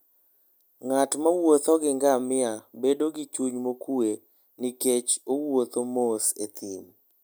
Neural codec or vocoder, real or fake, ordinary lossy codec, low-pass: none; real; none; none